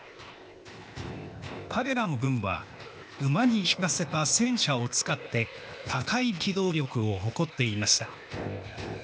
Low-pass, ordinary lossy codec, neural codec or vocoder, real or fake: none; none; codec, 16 kHz, 0.8 kbps, ZipCodec; fake